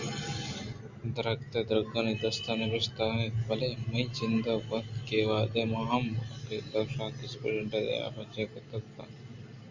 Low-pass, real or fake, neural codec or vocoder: 7.2 kHz; real; none